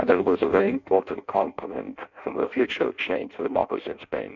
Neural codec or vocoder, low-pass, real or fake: codec, 16 kHz in and 24 kHz out, 0.6 kbps, FireRedTTS-2 codec; 7.2 kHz; fake